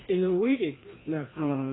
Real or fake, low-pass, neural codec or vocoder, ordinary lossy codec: fake; 7.2 kHz; codec, 16 kHz, 1 kbps, FreqCodec, larger model; AAC, 16 kbps